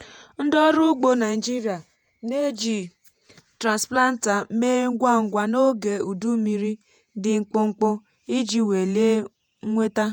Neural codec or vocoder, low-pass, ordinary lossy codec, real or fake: vocoder, 48 kHz, 128 mel bands, Vocos; 19.8 kHz; none; fake